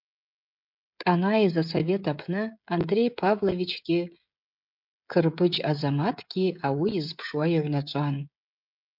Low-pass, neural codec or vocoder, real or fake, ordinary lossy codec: 5.4 kHz; codec, 16 kHz, 16 kbps, FreqCodec, smaller model; fake; MP3, 48 kbps